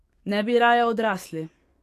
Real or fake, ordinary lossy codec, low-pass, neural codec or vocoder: fake; AAC, 64 kbps; 14.4 kHz; codec, 44.1 kHz, 7.8 kbps, DAC